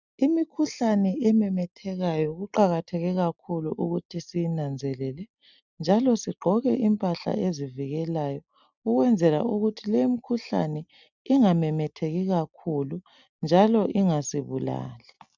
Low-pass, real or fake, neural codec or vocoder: 7.2 kHz; real; none